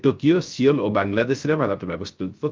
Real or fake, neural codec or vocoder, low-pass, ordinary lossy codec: fake; codec, 16 kHz, 0.3 kbps, FocalCodec; 7.2 kHz; Opus, 24 kbps